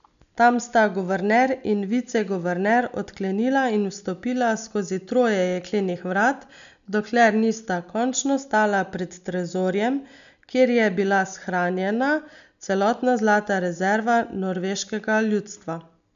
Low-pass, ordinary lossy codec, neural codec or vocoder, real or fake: 7.2 kHz; none; none; real